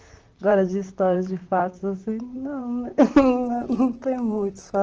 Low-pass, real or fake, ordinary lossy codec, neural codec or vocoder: 7.2 kHz; fake; Opus, 16 kbps; vocoder, 44.1 kHz, 128 mel bands, Pupu-Vocoder